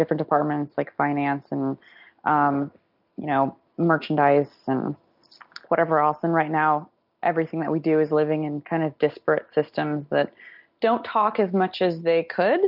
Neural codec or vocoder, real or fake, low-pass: none; real; 5.4 kHz